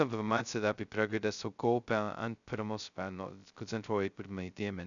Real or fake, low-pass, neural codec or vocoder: fake; 7.2 kHz; codec, 16 kHz, 0.2 kbps, FocalCodec